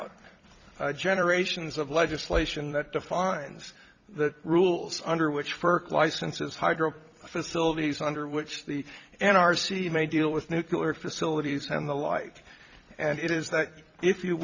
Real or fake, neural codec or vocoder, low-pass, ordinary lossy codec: fake; vocoder, 44.1 kHz, 128 mel bands every 256 samples, BigVGAN v2; 7.2 kHz; Opus, 64 kbps